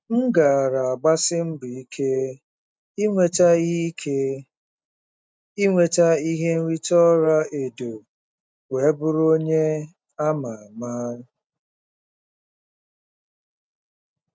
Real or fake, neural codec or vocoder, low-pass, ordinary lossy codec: real; none; none; none